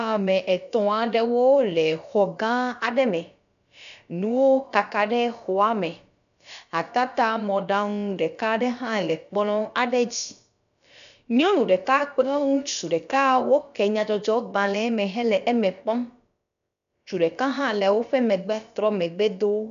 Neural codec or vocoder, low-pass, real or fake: codec, 16 kHz, about 1 kbps, DyCAST, with the encoder's durations; 7.2 kHz; fake